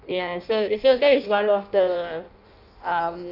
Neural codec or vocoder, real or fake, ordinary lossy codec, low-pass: codec, 16 kHz in and 24 kHz out, 0.6 kbps, FireRedTTS-2 codec; fake; none; 5.4 kHz